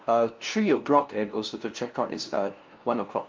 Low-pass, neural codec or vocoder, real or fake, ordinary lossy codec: 7.2 kHz; codec, 16 kHz, 0.5 kbps, FunCodec, trained on LibriTTS, 25 frames a second; fake; Opus, 32 kbps